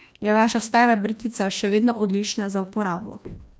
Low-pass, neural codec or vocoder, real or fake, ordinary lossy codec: none; codec, 16 kHz, 1 kbps, FreqCodec, larger model; fake; none